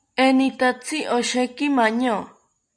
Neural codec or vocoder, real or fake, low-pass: none; real; 9.9 kHz